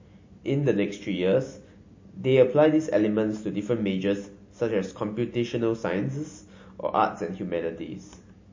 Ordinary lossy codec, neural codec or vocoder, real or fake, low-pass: MP3, 32 kbps; none; real; 7.2 kHz